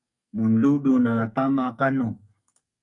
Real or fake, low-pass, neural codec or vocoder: fake; 10.8 kHz; codec, 44.1 kHz, 2.6 kbps, SNAC